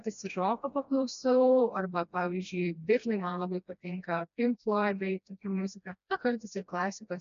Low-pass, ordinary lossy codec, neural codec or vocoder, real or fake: 7.2 kHz; MP3, 64 kbps; codec, 16 kHz, 1 kbps, FreqCodec, smaller model; fake